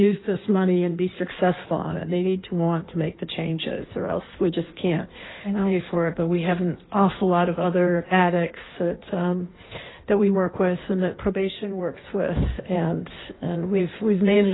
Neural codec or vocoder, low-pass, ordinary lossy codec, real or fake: codec, 16 kHz in and 24 kHz out, 1.1 kbps, FireRedTTS-2 codec; 7.2 kHz; AAC, 16 kbps; fake